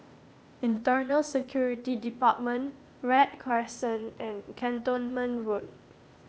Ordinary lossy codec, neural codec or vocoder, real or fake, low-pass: none; codec, 16 kHz, 0.8 kbps, ZipCodec; fake; none